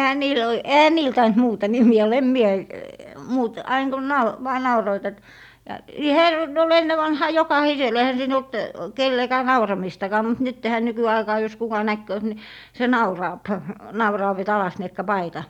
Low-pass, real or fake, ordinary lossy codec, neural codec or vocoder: 19.8 kHz; real; none; none